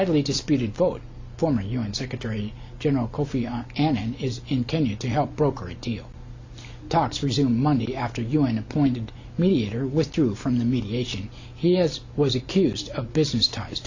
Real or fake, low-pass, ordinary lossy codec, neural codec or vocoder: real; 7.2 kHz; AAC, 48 kbps; none